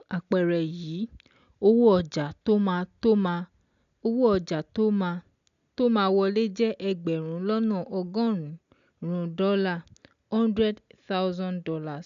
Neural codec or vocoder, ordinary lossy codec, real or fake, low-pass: none; none; real; 7.2 kHz